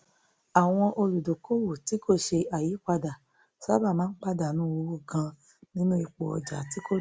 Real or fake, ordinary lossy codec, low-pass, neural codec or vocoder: real; none; none; none